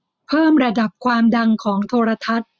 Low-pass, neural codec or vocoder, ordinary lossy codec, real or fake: none; none; none; real